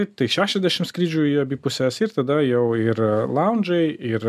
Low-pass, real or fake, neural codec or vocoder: 14.4 kHz; real; none